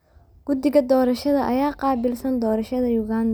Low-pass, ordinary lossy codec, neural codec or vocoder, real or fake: none; none; none; real